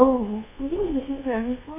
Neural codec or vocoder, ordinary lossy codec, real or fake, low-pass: codec, 24 kHz, 1.2 kbps, DualCodec; AAC, 32 kbps; fake; 3.6 kHz